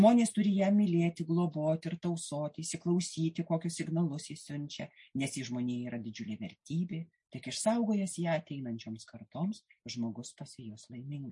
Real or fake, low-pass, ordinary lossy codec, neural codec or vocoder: real; 10.8 kHz; MP3, 48 kbps; none